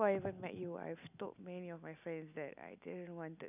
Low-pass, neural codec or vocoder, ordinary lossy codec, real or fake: 3.6 kHz; none; none; real